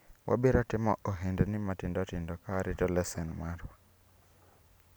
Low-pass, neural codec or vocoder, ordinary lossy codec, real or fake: none; none; none; real